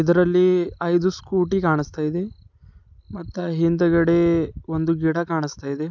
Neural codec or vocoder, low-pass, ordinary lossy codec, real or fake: none; 7.2 kHz; none; real